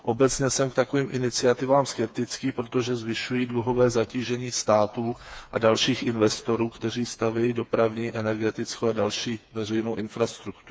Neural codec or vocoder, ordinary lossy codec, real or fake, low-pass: codec, 16 kHz, 4 kbps, FreqCodec, smaller model; none; fake; none